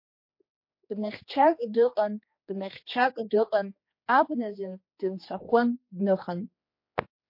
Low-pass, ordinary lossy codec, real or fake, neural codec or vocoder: 5.4 kHz; MP3, 32 kbps; fake; codec, 16 kHz, 2 kbps, X-Codec, HuBERT features, trained on general audio